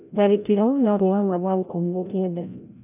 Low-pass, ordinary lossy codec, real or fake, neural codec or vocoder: 3.6 kHz; none; fake; codec, 16 kHz, 0.5 kbps, FreqCodec, larger model